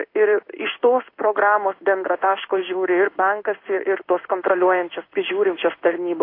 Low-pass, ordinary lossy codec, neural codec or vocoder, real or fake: 5.4 kHz; AAC, 32 kbps; codec, 16 kHz in and 24 kHz out, 1 kbps, XY-Tokenizer; fake